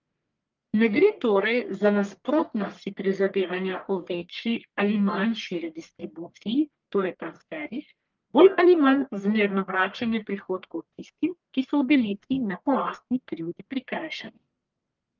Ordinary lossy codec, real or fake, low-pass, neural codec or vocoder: Opus, 24 kbps; fake; 7.2 kHz; codec, 44.1 kHz, 1.7 kbps, Pupu-Codec